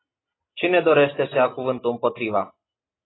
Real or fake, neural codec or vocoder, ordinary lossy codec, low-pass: real; none; AAC, 16 kbps; 7.2 kHz